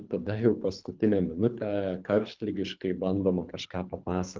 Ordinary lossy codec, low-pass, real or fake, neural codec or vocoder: Opus, 24 kbps; 7.2 kHz; fake; codec, 24 kHz, 3 kbps, HILCodec